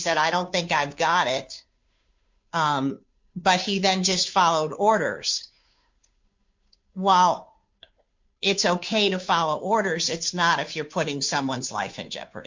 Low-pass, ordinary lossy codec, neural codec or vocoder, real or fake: 7.2 kHz; MP3, 48 kbps; codec, 16 kHz, 2 kbps, FunCodec, trained on Chinese and English, 25 frames a second; fake